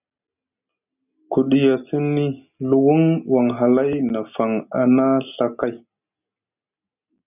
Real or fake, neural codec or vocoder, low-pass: real; none; 3.6 kHz